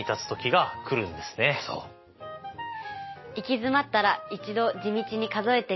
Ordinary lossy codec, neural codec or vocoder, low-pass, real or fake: MP3, 24 kbps; none; 7.2 kHz; real